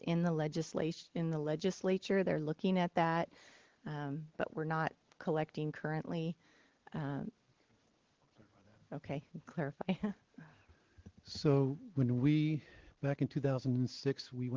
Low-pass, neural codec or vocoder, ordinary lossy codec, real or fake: 7.2 kHz; none; Opus, 16 kbps; real